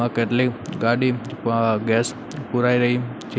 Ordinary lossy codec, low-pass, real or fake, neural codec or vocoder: none; none; real; none